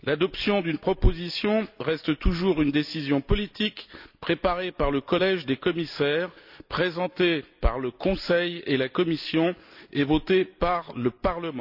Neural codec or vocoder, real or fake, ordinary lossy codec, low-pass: none; real; none; 5.4 kHz